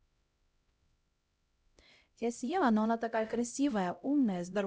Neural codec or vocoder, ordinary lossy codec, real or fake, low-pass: codec, 16 kHz, 0.5 kbps, X-Codec, HuBERT features, trained on LibriSpeech; none; fake; none